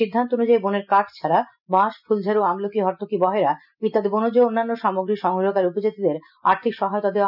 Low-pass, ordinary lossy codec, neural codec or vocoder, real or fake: 5.4 kHz; none; none; real